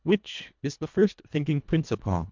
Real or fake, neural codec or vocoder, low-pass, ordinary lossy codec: fake; codec, 24 kHz, 1.5 kbps, HILCodec; 7.2 kHz; MP3, 64 kbps